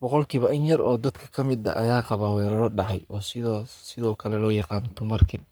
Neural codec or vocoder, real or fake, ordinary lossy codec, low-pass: codec, 44.1 kHz, 3.4 kbps, Pupu-Codec; fake; none; none